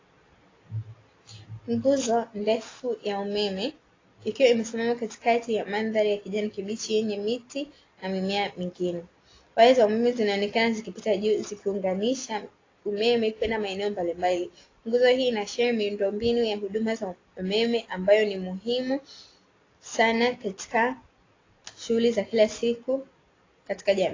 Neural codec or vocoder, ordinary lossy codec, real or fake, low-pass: none; AAC, 32 kbps; real; 7.2 kHz